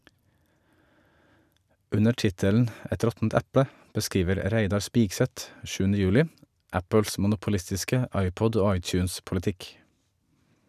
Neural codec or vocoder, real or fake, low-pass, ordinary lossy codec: none; real; 14.4 kHz; none